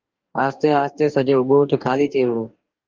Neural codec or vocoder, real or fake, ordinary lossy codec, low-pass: codec, 44.1 kHz, 2.6 kbps, DAC; fake; Opus, 32 kbps; 7.2 kHz